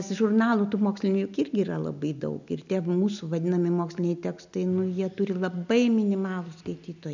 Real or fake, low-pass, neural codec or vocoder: real; 7.2 kHz; none